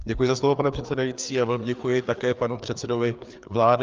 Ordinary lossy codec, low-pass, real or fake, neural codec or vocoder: Opus, 24 kbps; 7.2 kHz; fake; codec, 16 kHz, 4 kbps, FreqCodec, larger model